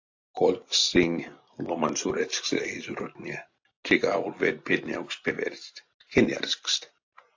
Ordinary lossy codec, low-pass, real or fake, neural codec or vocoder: AAC, 48 kbps; 7.2 kHz; real; none